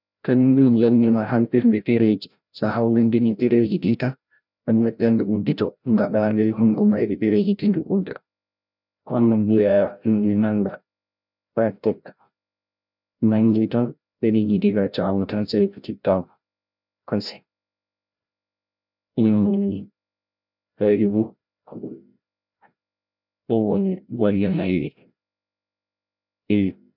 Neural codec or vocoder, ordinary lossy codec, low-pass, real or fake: codec, 16 kHz, 0.5 kbps, FreqCodec, larger model; none; 5.4 kHz; fake